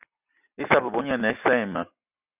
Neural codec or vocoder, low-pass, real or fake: none; 3.6 kHz; real